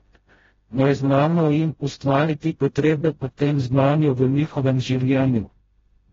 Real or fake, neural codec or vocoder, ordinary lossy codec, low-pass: fake; codec, 16 kHz, 0.5 kbps, FreqCodec, smaller model; AAC, 24 kbps; 7.2 kHz